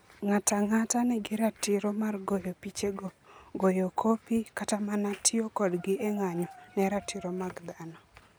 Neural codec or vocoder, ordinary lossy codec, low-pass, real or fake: none; none; none; real